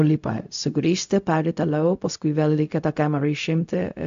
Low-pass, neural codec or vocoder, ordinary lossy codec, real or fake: 7.2 kHz; codec, 16 kHz, 0.4 kbps, LongCat-Audio-Codec; AAC, 64 kbps; fake